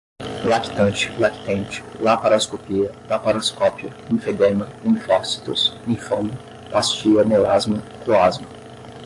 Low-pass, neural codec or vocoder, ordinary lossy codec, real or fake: 10.8 kHz; codec, 44.1 kHz, 7.8 kbps, DAC; AAC, 64 kbps; fake